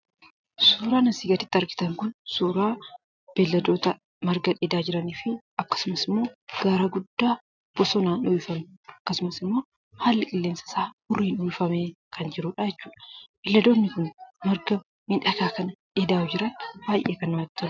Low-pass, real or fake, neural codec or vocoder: 7.2 kHz; real; none